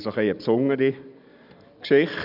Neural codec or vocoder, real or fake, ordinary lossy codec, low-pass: none; real; none; 5.4 kHz